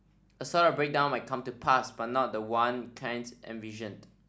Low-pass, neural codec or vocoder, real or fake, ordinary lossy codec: none; none; real; none